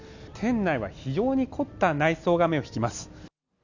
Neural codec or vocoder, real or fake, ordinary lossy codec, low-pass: none; real; none; 7.2 kHz